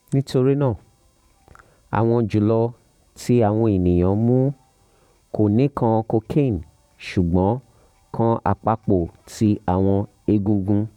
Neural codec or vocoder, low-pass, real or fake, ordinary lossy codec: vocoder, 44.1 kHz, 128 mel bands every 512 samples, BigVGAN v2; 19.8 kHz; fake; none